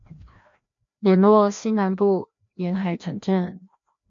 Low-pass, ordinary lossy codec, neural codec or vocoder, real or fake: 7.2 kHz; MP3, 48 kbps; codec, 16 kHz, 1 kbps, FreqCodec, larger model; fake